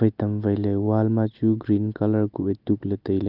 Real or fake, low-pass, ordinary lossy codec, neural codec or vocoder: real; 5.4 kHz; Opus, 24 kbps; none